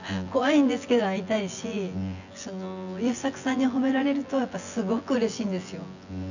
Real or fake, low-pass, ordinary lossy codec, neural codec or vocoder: fake; 7.2 kHz; none; vocoder, 24 kHz, 100 mel bands, Vocos